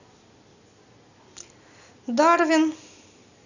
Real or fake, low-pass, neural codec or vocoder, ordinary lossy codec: real; 7.2 kHz; none; none